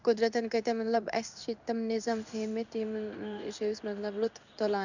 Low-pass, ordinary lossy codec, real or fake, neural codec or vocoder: 7.2 kHz; none; fake; codec, 16 kHz in and 24 kHz out, 1 kbps, XY-Tokenizer